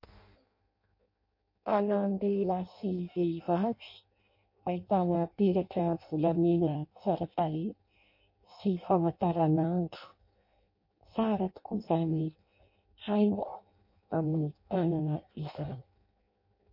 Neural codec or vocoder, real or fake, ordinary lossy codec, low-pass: codec, 16 kHz in and 24 kHz out, 0.6 kbps, FireRedTTS-2 codec; fake; MP3, 32 kbps; 5.4 kHz